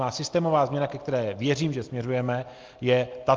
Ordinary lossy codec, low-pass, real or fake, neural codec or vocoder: Opus, 24 kbps; 7.2 kHz; real; none